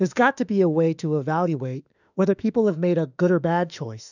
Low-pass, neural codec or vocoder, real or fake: 7.2 kHz; autoencoder, 48 kHz, 32 numbers a frame, DAC-VAE, trained on Japanese speech; fake